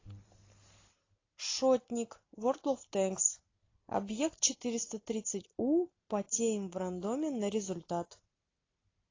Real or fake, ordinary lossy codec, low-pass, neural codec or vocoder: real; AAC, 32 kbps; 7.2 kHz; none